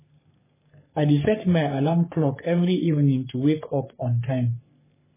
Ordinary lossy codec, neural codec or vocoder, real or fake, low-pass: MP3, 16 kbps; codec, 44.1 kHz, 3.4 kbps, Pupu-Codec; fake; 3.6 kHz